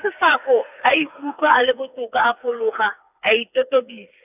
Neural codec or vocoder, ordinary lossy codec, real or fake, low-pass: codec, 16 kHz, 4 kbps, FreqCodec, smaller model; none; fake; 3.6 kHz